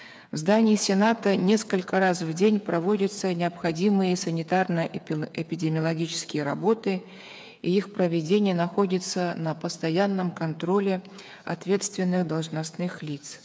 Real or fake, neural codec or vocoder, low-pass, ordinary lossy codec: fake; codec, 16 kHz, 8 kbps, FreqCodec, smaller model; none; none